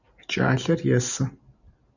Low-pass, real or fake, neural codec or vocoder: 7.2 kHz; real; none